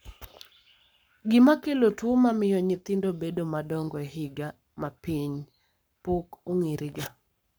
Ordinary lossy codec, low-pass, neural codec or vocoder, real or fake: none; none; codec, 44.1 kHz, 7.8 kbps, Pupu-Codec; fake